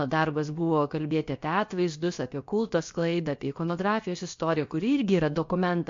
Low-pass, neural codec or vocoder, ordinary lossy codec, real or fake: 7.2 kHz; codec, 16 kHz, about 1 kbps, DyCAST, with the encoder's durations; MP3, 48 kbps; fake